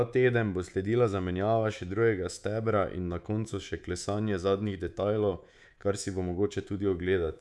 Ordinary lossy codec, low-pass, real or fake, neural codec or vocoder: none; none; fake; codec, 24 kHz, 3.1 kbps, DualCodec